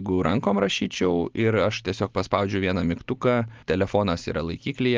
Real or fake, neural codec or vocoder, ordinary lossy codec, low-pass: real; none; Opus, 24 kbps; 7.2 kHz